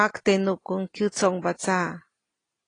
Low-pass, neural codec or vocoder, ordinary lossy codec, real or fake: 9.9 kHz; none; AAC, 32 kbps; real